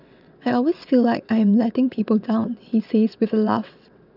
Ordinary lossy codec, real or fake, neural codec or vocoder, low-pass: none; fake; vocoder, 22.05 kHz, 80 mel bands, WaveNeXt; 5.4 kHz